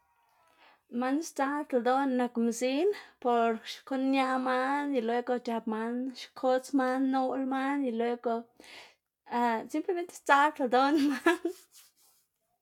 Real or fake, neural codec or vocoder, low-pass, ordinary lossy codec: real; none; 19.8 kHz; none